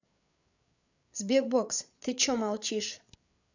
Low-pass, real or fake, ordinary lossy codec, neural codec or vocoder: 7.2 kHz; fake; none; codec, 16 kHz, 8 kbps, FreqCodec, larger model